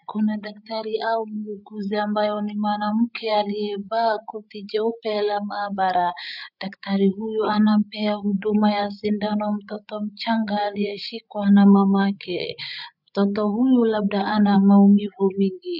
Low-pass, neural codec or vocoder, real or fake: 5.4 kHz; codec, 16 kHz, 8 kbps, FreqCodec, larger model; fake